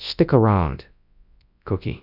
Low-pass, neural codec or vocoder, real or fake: 5.4 kHz; codec, 24 kHz, 0.9 kbps, WavTokenizer, large speech release; fake